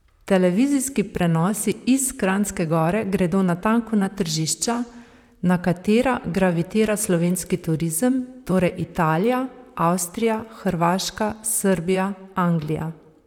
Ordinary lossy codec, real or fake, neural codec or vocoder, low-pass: none; fake; vocoder, 44.1 kHz, 128 mel bands, Pupu-Vocoder; 19.8 kHz